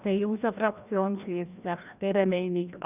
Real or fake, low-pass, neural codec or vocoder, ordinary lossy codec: fake; 3.6 kHz; codec, 16 kHz, 1 kbps, FreqCodec, larger model; none